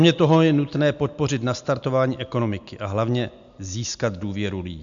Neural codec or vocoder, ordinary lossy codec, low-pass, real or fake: none; MP3, 64 kbps; 7.2 kHz; real